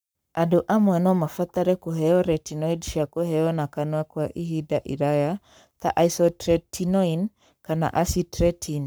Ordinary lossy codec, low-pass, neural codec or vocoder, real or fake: none; none; codec, 44.1 kHz, 7.8 kbps, Pupu-Codec; fake